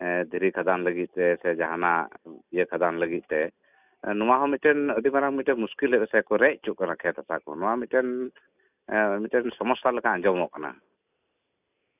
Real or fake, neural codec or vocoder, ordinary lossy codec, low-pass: real; none; none; 3.6 kHz